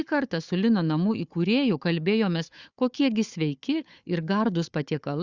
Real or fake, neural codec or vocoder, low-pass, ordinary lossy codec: fake; codec, 16 kHz, 4 kbps, FunCodec, trained on Chinese and English, 50 frames a second; 7.2 kHz; Opus, 64 kbps